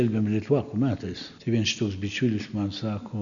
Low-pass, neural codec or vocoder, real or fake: 7.2 kHz; none; real